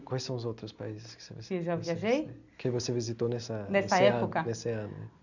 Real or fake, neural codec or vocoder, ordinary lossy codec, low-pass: real; none; none; 7.2 kHz